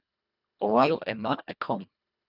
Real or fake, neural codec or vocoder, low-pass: fake; codec, 24 kHz, 1.5 kbps, HILCodec; 5.4 kHz